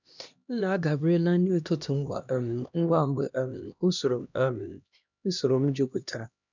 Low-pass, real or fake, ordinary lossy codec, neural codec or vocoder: 7.2 kHz; fake; none; codec, 16 kHz, 1 kbps, X-Codec, HuBERT features, trained on LibriSpeech